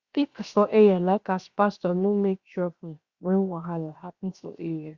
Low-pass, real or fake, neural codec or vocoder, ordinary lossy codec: 7.2 kHz; fake; codec, 16 kHz, 0.7 kbps, FocalCodec; Opus, 64 kbps